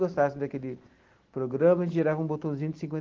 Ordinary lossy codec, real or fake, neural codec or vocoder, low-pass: Opus, 16 kbps; real; none; 7.2 kHz